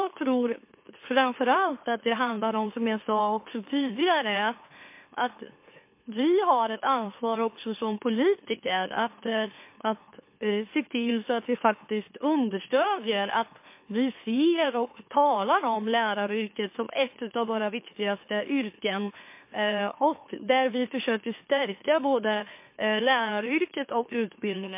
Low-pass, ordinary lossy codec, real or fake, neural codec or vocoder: 3.6 kHz; MP3, 24 kbps; fake; autoencoder, 44.1 kHz, a latent of 192 numbers a frame, MeloTTS